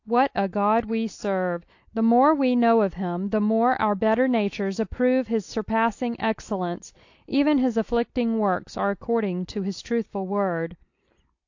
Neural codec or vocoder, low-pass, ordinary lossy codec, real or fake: none; 7.2 kHz; AAC, 48 kbps; real